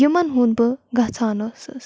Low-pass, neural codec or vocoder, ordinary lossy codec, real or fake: none; none; none; real